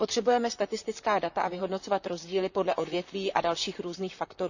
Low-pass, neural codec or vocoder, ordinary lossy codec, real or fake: 7.2 kHz; vocoder, 44.1 kHz, 128 mel bands, Pupu-Vocoder; none; fake